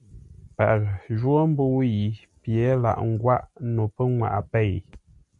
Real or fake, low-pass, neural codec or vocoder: real; 10.8 kHz; none